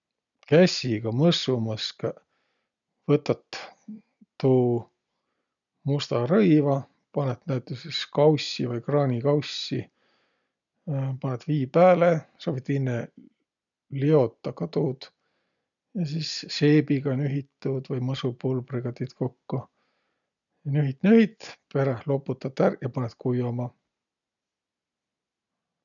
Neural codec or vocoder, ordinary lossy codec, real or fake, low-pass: none; none; real; 7.2 kHz